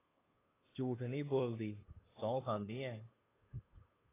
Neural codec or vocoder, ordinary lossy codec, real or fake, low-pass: codec, 16 kHz, 2 kbps, FunCodec, trained on Chinese and English, 25 frames a second; AAC, 16 kbps; fake; 3.6 kHz